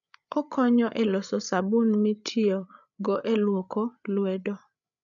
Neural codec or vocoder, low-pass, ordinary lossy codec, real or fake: codec, 16 kHz, 8 kbps, FreqCodec, larger model; 7.2 kHz; none; fake